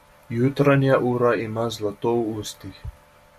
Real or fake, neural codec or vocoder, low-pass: real; none; 14.4 kHz